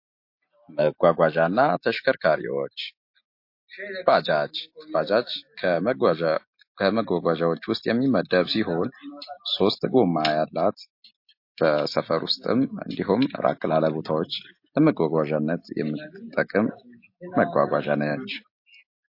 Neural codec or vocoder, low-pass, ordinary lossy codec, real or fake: none; 5.4 kHz; MP3, 32 kbps; real